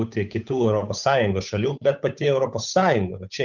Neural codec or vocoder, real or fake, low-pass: codec, 16 kHz, 8 kbps, FunCodec, trained on Chinese and English, 25 frames a second; fake; 7.2 kHz